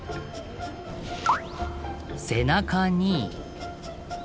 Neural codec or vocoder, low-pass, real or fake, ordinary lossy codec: none; none; real; none